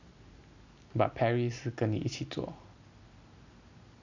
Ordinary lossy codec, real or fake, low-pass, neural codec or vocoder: none; real; 7.2 kHz; none